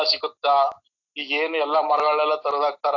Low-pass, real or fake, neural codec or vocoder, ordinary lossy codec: 7.2 kHz; real; none; AAC, 48 kbps